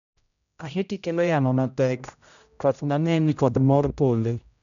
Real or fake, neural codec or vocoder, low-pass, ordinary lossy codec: fake; codec, 16 kHz, 0.5 kbps, X-Codec, HuBERT features, trained on general audio; 7.2 kHz; none